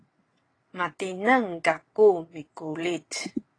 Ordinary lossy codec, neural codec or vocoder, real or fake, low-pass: AAC, 32 kbps; vocoder, 22.05 kHz, 80 mel bands, WaveNeXt; fake; 9.9 kHz